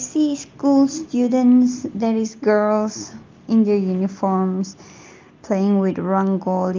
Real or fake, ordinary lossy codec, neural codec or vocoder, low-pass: real; Opus, 24 kbps; none; 7.2 kHz